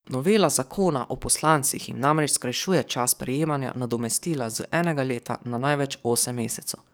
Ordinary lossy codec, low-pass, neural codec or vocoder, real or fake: none; none; codec, 44.1 kHz, 7.8 kbps, DAC; fake